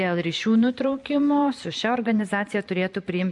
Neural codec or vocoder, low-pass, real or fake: vocoder, 44.1 kHz, 128 mel bands every 512 samples, BigVGAN v2; 10.8 kHz; fake